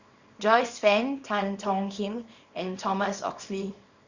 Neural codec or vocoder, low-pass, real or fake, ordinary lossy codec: codec, 24 kHz, 0.9 kbps, WavTokenizer, small release; 7.2 kHz; fake; Opus, 64 kbps